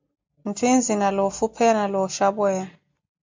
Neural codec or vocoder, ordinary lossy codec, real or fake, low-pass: none; MP3, 64 kbps; real; 7.2 kHz